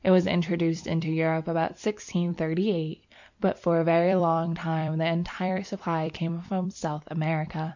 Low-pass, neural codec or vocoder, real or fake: 7.2 kHz; vocoder, 44.1 kHz, 128 mel bands every 256 samples, BigVGAN v2; fake